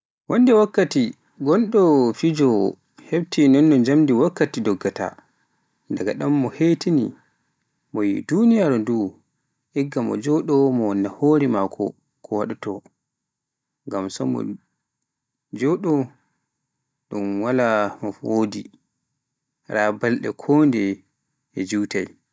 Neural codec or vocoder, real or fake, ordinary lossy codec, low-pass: none; real; none; none